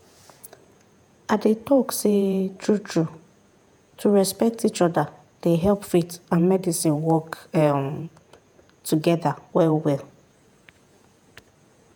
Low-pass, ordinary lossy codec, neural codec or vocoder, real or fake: none; none; vocoder, 48 kHz, 128 mel bands, Vocos; fake